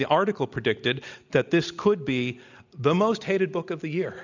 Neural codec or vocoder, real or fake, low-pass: none; real; 7.2 kHz